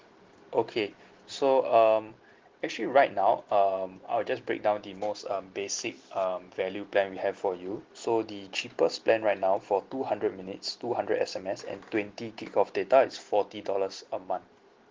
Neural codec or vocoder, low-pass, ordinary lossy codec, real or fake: none; 7.2 kHz; Opus, 16 kbps; real